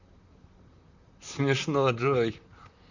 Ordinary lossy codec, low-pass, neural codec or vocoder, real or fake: none; 7.2 kHz; vocoder, 44.1 kHz, 128 mel bands, Pupu-Vocoder; fake